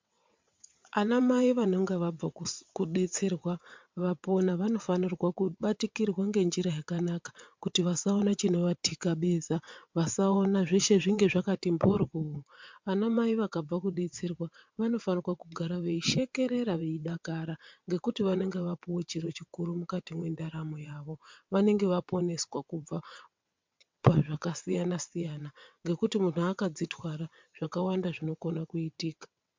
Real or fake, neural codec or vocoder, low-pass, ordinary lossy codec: fake; vocoder, 22.05 kHz, 80 mel bands, WaveNeXt; 7.2 kHz; MP3, 64 kbps